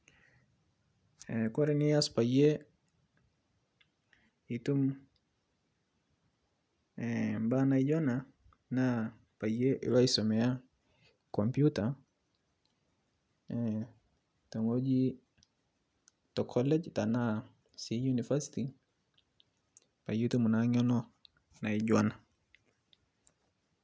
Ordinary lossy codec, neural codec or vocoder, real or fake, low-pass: none; none; real; none